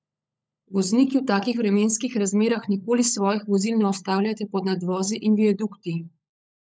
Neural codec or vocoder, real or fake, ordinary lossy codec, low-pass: codec, 16 kHz, 16 kbps, FunCodec, trained on LibriTTS, 50 frames a second; fake; none; none